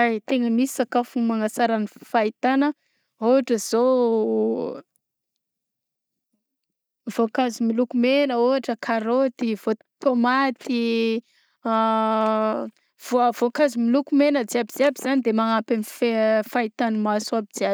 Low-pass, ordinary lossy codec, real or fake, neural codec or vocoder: none; none; real; none